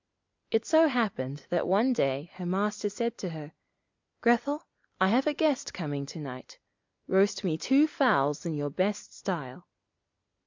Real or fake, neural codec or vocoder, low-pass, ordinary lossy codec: real; none; 7.2 kHz; MP3, 64 kbps